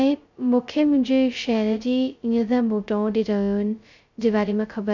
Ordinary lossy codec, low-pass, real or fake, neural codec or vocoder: none; 7.2 kHz; fake; codec, 16 kHz, 0.2 kbps, FocalCodec